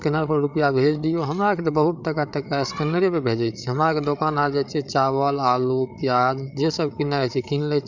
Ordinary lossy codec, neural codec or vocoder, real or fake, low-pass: none; codec, 16 kHz, 8 kbps, FreqCodec, larger model; fake; 7.2 kHz